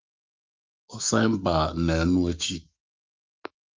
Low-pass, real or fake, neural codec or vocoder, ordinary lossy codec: 7.2 kHz; fake; autoencoder, 48 kHz, 128 numbers a frame, DAC-VAE, trained on Japanese speech; Opus, 24 kbps